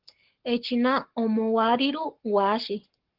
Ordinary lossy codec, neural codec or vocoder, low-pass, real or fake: Opus, 16 kbps; codec, 44.1 kHz, 7.8 kbps, Pupu-Codec; 5.4 kHz; fake